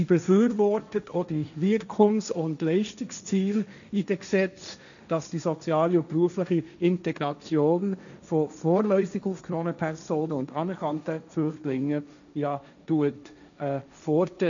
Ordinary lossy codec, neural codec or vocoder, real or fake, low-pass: none; codec, 16 kHz, 1.1 kbps, Voila-Tokenizer; fake; 7.2 kHz